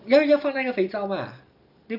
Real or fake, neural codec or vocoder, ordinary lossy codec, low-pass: real; none; none; 5.4 kHz